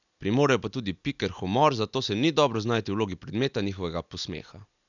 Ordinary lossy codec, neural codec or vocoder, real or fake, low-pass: none; none; real; 7.2 kHz